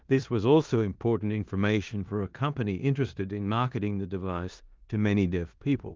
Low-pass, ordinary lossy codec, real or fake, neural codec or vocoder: 7.2 kHz; Opus, 32 kbps; fake; codec, 16 kHz in and 24 kHz out, 0.9 kbps, LongCat-Audio-Codec, four codebook decoder